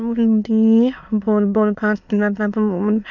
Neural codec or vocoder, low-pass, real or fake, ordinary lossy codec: autoencoder, 22.05 kHz, a latent of 192 numbers a frame, VITS, trained on many speakers; 7.2 kHz; fake; none